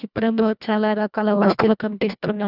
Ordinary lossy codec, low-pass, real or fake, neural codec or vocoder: none; 5.4 kHz; fake; codec, 24 kHz, 1.5 kbps, HILCodec